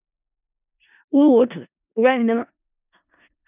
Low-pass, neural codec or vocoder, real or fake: 3.6 kHz; codec, 16 kHz in and 24 kHz out, 0.4 kbps, LongCat-Audio-Codec, four codebook decoder; fake